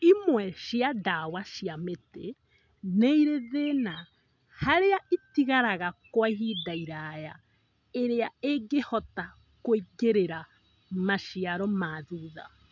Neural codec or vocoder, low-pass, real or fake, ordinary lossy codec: none; 7.2 kHz; real; none